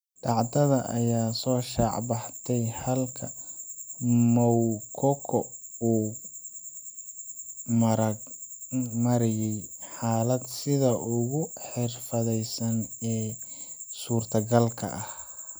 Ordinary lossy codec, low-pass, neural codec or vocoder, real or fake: none; none; none; real